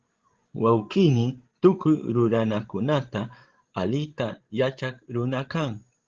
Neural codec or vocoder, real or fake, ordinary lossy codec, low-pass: codec, 16 kHz, 16 kbps, FreqCodec, larger model; fake; Opus, 16 kbps; 7.2 kHz